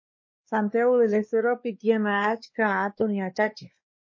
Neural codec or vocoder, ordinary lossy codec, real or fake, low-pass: codec, 16 kHz, 2 kbps, X-Codec, WavLM features, trained on Multilingual LibriSpeech; MP3, 32 kbps; fake; 7.2 kHz